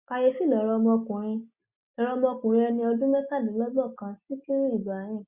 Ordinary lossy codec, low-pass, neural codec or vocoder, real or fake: Opus, 64 kbps; 3.6 kHz; none; real